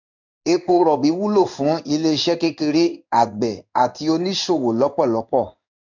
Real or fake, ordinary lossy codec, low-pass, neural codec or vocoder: fake; none; 7.2 kHz; codec, 16 kHz in and 24 kHz out, 1 kbps, XY-Tokenizer